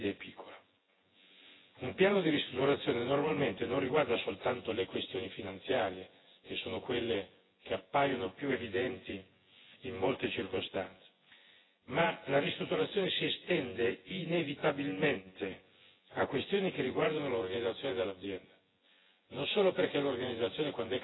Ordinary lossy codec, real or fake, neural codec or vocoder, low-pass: AAC, 16 kbps; fake; vocoder, 24 kHz, 100 mel bands, Vocos; 7.2 kHz